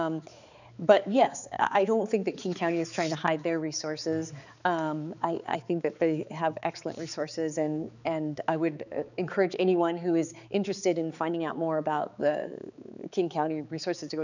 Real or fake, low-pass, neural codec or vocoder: fake; 7.2 kHz; codec, 16 kHz, 4 kbps, X-Codec, HuBERT features, trained on balanced general audio